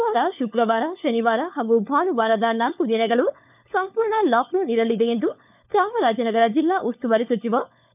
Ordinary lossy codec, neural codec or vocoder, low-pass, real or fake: none; codec, 16 kHz, 4 kbps, FunCodec, trained on LibriTTS, 50 frames a second; 3.6 kHz; fake